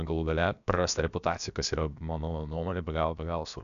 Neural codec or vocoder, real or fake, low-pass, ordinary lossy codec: codec, 16 kHz, about 1 kbps, DyCAST, with the encoder's durations; fake; 7.2 kHz; MP3, 96 kbps